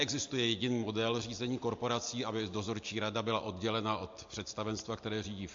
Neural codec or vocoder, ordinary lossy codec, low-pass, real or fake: none; MP3, 48 kbps; 7.2 kHz; real